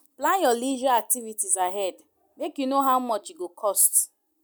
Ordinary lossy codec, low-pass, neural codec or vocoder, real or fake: none; none; none; real